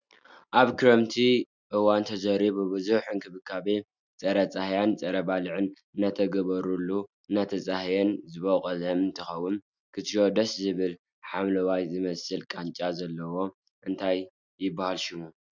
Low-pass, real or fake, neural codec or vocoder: 7.2 kHz; real; none